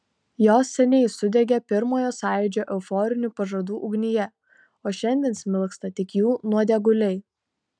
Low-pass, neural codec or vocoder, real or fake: 9.9 kHz; none; real